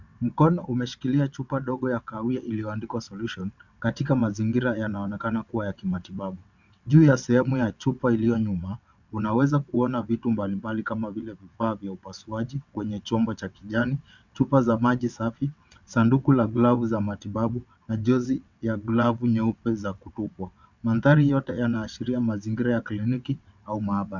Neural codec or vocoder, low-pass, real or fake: vocoder, 22.05 kHz, 80 mel bands, WaveNeXt; 7.2 kHz; fake